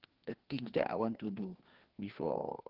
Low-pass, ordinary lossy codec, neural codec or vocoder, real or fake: 5.4 kHz; Opus, 16 kbps; codec, 16 kHz, 2 kbps, FreqCodec, larger model; fake